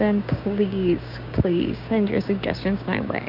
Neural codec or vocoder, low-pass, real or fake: codec, 16 kHz, 6 kbps, DAC; 5.4 kHz; fake